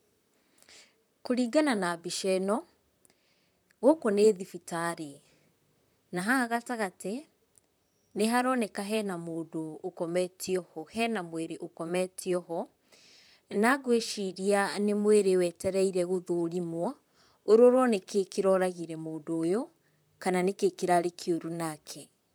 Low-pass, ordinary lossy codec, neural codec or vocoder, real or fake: none; none; vocoder, 44.1 kHz, 128 mel bands, Pupu-Vocoder; fake